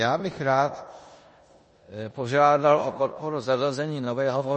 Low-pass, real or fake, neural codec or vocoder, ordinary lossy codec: 10.8 kHz; fake; codec, 16 kHz in and 24 kHz out, 0.9 kbps, LongCat-Audio-Codec, four codebook decoder; MP3, 32 kbps